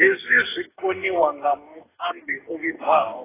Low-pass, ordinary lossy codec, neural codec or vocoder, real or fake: 3.6 kHz; AAC, 16 kbps; codec, 44.1 kHz, 2.6 kbps, DAC; fake